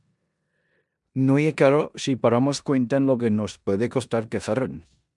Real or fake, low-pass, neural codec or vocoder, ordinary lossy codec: fake; 10.8 kHz; codec, 16 kHz in and 24 kHz out, 0.9 kbps, LongCat-Audio-Codec, four codebook decoder; MP3, 96 kbps